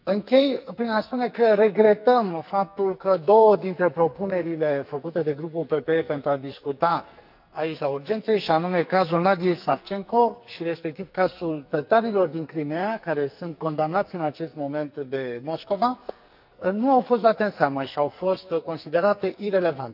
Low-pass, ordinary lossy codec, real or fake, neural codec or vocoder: 5.4 kHz; none; fake; codec, 44.1 kHz, 2.6 kbps, SNAC